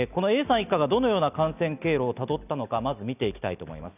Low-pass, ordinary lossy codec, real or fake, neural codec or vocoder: 3.6 kHz; none; real; none